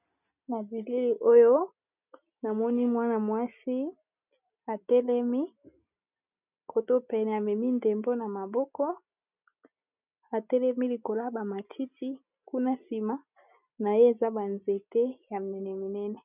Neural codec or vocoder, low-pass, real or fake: none; 3.6 kHz; real